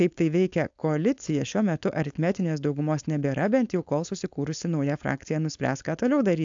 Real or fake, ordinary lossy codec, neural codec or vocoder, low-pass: fake; AAC, 64 kbps; codec, 16 kHz, 4.8 kbps, FACodec; 7.2 kHz